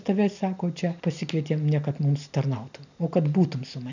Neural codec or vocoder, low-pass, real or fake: none; 7.2 kHz; real